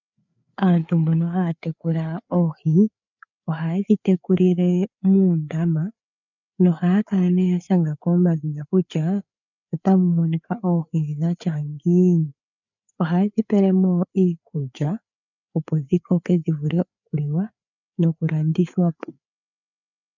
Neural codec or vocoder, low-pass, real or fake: codec, 16 kHz, 4 kbps, FreqCodec, larger model; 7.2 kHz; fake